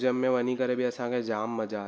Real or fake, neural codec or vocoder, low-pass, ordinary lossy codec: real; none; none; none